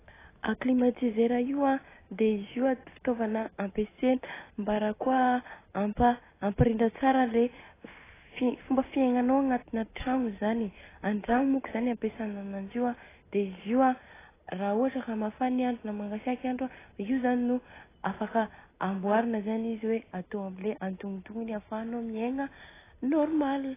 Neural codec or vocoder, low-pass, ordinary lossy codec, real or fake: none; 3.6 kHz; AAC, 16 kbps; real